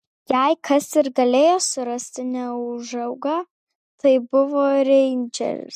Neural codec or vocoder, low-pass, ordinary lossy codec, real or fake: none; 14.4 kHz; MP3, 64 kbps; real